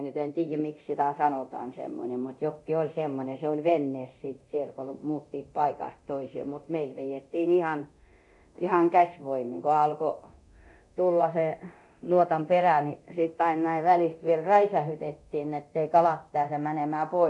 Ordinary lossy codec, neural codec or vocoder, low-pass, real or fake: none; codec, 24 kHz, 0.9 kbps, DualCodec; 10.8 kHz; fake